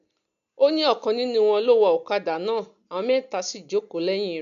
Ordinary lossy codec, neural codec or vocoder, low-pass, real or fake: none; none; 7.2 kHz; real